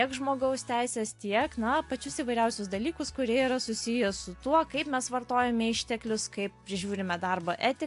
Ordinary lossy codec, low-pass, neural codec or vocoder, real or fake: AAC, 64 kbps; 10.8 kHz; none; real